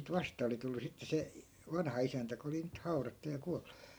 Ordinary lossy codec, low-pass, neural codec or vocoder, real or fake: none; none; vocoder, 44.1 kHz, 128 mel bands every 512 samples, BigVGAN v2; fake